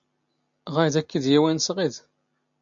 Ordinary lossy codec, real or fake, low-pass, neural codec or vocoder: AAC, 64 kbps; real; 7.2 kHz; none